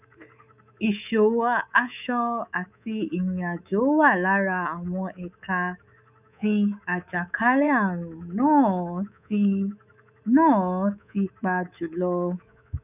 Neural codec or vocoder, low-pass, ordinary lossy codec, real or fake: codec, 24 kHz, 3.1 kbps, DualCodec; 3.6 kHz; none; fake